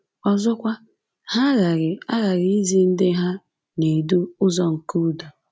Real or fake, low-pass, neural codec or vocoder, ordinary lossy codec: real; none; none; none